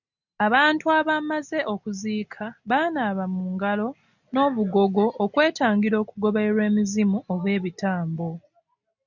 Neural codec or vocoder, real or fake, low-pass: none; real; 7.2 kHz